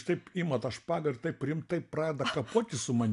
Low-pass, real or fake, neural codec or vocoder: 10.8 kHz; real; none